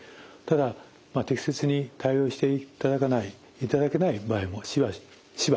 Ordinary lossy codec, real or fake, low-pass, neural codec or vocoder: none; real; none; none